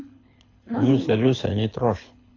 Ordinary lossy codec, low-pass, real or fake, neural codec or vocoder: AAC, 32 kbps; 7.2 kHz; fake; codec, 24 kHz, 6 kbps, HILCodec